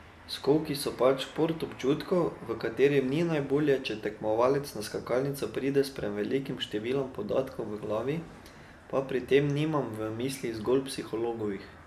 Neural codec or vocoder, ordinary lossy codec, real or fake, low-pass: none; AAC, 96 kbps; real; 14.4 kHz